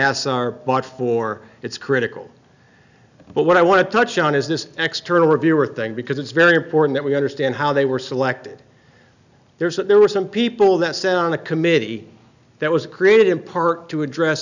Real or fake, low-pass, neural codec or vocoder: real; 7.2 kHz; none